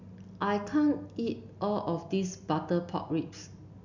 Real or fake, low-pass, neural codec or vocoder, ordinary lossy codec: real; 7.2 kHz; none; none